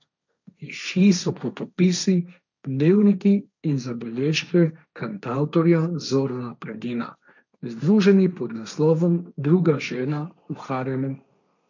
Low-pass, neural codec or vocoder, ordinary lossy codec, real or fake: 7.2 kHz; codec, 16 kHz, 1.1 kbps, Voila-Tokenizer; none; fake